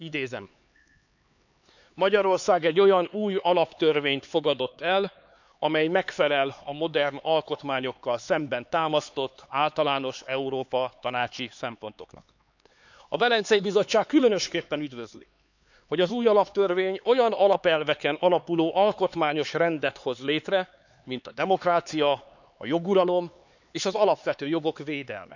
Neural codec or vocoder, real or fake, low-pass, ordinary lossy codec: codec, 16 kHz, 4 kbps, X-Codec, HuBERT features, trained on LibriSpeech; fake; 7.2 kHz; none